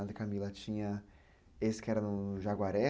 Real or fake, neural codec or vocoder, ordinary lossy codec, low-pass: real; none; none; none